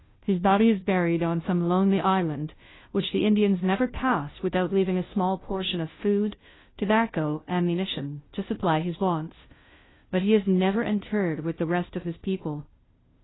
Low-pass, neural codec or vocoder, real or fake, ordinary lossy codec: 7.2 kHz; codec, 16 kHz, 0.5 kbps, FunCodec, trained on Chinese and English, 25 frames a second; fake; AAC, 16 kbps